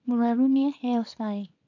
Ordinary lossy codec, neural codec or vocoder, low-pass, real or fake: none; codec, 24 kHz, 0.9 kbps, WavTokenizer, small release; 7.2 kHz; fake